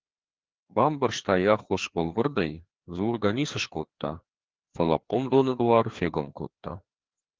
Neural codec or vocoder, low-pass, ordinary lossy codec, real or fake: codec, 16 kHz, 2 kbps, FreqCodec, larger model; 7.2 kHz; Opus, 16 kbps; fake